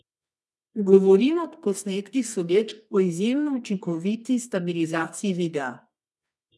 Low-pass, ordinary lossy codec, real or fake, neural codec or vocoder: none; none; fake; codec, 24 kHz, 0.9 kbps, WavTokenizer, medium music audio release